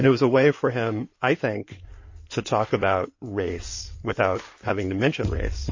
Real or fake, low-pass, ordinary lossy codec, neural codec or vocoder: fake; 7.2 kHz; MP3, 32 kbps; codec, 16 kHz in and 24 kHz out, 2.2 kbps, FireRedTTS-2 codec